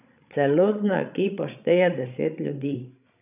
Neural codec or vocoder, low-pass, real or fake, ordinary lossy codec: codec, 16 kHz, 16 kbps, FreqCodec, larger model; 3.6 kHz; fake; none